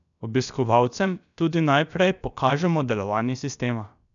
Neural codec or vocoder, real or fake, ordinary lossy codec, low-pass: codec, 16 kHz, about 1 kbps, DyCAST, with the encoder's durations; fake; none; 7.2 kHz